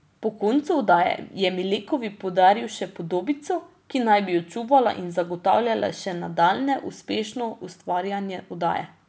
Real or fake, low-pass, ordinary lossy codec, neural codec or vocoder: real; none; none; none